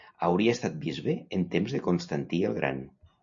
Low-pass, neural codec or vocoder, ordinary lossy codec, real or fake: 7.2 kHz; none; MP3, 64 kbps; real